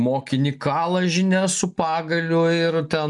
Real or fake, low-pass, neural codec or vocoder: real; 10.8 kHz; none